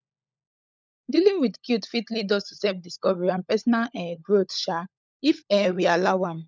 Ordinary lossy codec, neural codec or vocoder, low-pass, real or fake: none; codec, 16 kHz, 16 kbps, FunCodec, trained on LibriTTS, 50 frames a second; none; fake